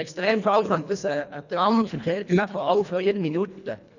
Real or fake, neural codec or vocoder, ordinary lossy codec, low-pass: fake; codec, 24 kHz, 1.5 kbps, HILCodec; none; 7.2 kHz